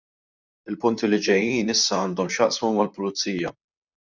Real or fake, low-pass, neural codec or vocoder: fake; 7.2 kHz; vocoder, 44.1 kHz, 128 mel bands, Pupu-Vocoder